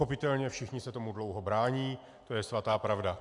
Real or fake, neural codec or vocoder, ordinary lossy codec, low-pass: real; none; AAC, 64 kbps; 10.8 kHz